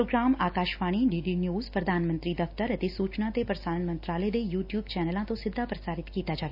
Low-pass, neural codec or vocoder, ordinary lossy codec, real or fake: 5.4 kHz; none; none; real